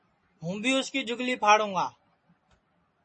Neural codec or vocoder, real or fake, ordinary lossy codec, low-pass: none; real; MP3, 32 kbps; 10.8 kHz